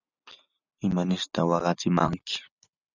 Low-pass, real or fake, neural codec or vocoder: 7.2 kHz; real; none